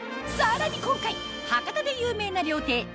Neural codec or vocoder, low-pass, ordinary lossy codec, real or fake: none; none; none; real